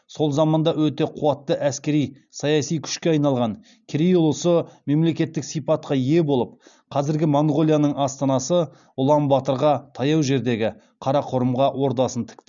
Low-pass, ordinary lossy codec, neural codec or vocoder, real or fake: 7.2 kHz; none; none; real